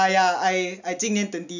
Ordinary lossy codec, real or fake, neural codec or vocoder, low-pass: none; real; none; 7.2 kHz